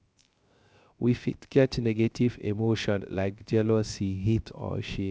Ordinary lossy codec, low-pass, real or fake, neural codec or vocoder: none; none; fake; codec, 16 kHz, 0.3 kbps, FocalCodec